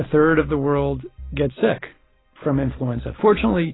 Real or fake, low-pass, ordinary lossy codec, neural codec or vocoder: real; 7.2 kHz; AAC, 16 kbps; none